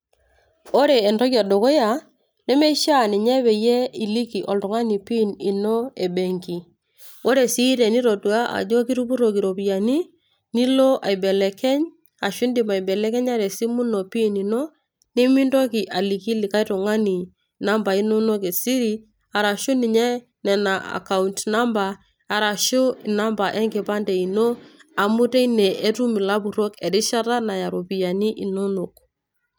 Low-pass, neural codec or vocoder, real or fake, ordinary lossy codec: none; none; real; none